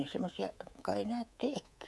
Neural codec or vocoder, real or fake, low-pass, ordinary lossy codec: codec, 24 kHz, 3.1 kbps, DualCodec; fake; none; none